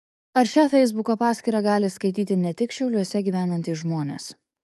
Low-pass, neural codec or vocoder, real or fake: 14.4 kHz; codec, 44.1 kHz, 7.8 kbps, DAC; fake